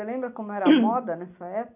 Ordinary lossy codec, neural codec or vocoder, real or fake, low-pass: none; none; real; 3.6 kHz